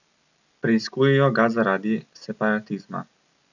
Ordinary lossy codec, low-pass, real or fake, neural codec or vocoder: none; 7.2 kHz; real; none